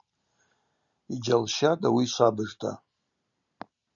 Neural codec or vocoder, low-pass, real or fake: none; 7.2 kHz; real